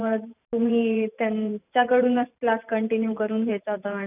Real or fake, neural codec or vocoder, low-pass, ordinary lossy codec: fake; vocoder, 44.1 kHz, 128 mel bands every 512 samples, BigVGAN v2; 3.6 kHz; none